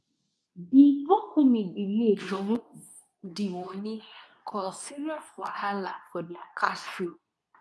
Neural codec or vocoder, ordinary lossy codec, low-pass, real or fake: codec, 24 kHz, 0.9 kbps, WavTokenizer, medium speech release version 2; none; none; fake